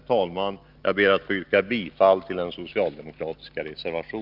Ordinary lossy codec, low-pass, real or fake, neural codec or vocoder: Opus, 32 kbps; 5.4 kHz; real; none